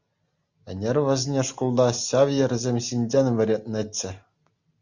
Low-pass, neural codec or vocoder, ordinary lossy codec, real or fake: 7.2 kHz; none; Opus, 64 kbps; real